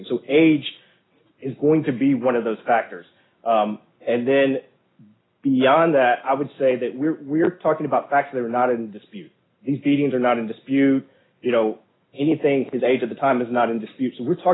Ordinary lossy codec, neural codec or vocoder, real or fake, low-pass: AAC, 16 kbps; none; real; 7.2 kHz